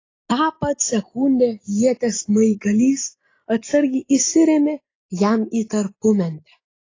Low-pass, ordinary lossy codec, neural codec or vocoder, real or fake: 7.2 kHz; AAC, 32 kbps; none; real